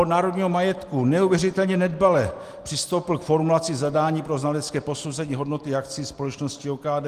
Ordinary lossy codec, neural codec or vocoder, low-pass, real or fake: Opus, 24 kbps; none; 14.4 kHz; real